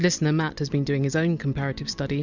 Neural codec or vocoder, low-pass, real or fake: none; 7.2 kHz; real